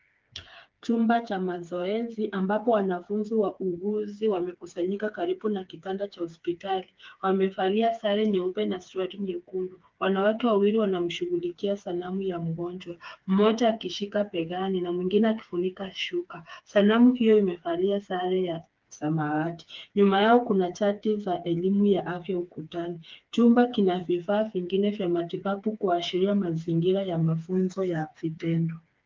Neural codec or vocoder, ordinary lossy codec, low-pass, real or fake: codec, 16 kHz, 4 kbps, FreqCodec, smaller model; Opus, 32 kbps; 7.2 kHz; fake